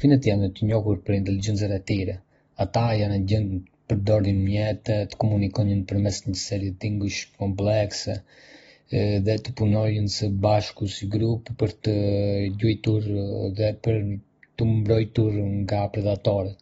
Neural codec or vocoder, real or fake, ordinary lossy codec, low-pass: none; real; AAC, 24 kbps; 19.8 kHz